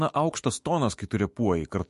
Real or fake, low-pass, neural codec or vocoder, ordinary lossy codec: fake; 14.4 kHz; autoencoder, 48 kHz, 128 numbers a frame, DAC-VAE, trained on Japanese speech; MP3, 48 kbps